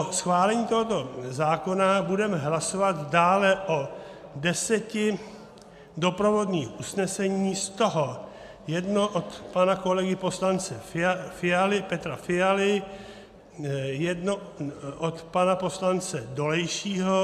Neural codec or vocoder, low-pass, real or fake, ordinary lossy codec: none; 14.4 kHz; real; AAC, 96 kbps